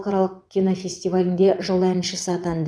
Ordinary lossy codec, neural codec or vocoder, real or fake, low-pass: none; vocoder, 22.05 kHz, 80 mel bands, WaveNeXt; fake; none